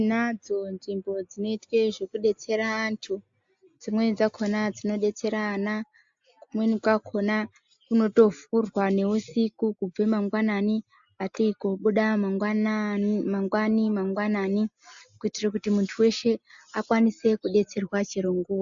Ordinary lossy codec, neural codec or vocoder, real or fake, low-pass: AAC, 64 kbps; none; real; 7.2 kHz